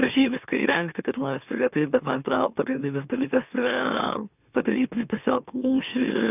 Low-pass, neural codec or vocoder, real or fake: 3.6 kHz; autoencoder, 44.1 kHz, a latent of 192 numbers a frame, MeloTTS; fake